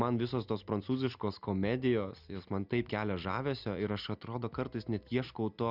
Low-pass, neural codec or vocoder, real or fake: 5.4 kHz; none; real